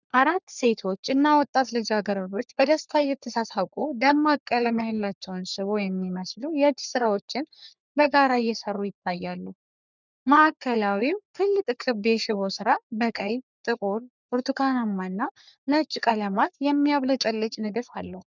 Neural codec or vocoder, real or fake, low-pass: codec, 44.1 kHz, 3.4 kbps, Pupu-Codec; fake; 7.2 kHz